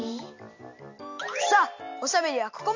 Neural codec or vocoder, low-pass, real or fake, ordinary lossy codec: none; 7.2 kHz; real; AAC, 48 kbps